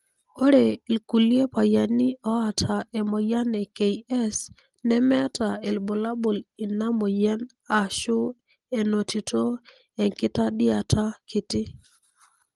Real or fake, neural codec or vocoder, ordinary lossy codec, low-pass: real; none; Opus, 24 kbps; 10.8 kHz